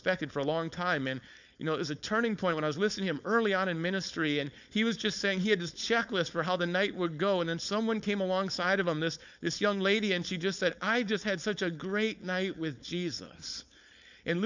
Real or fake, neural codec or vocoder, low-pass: fake; codec, 16 kHz, 4.8 kbps, FACodec; 7.2 kHz